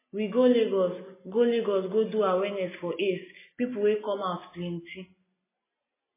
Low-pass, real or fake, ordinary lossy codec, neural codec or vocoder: 3.6 kHz; real; MP3, 16 kbps; none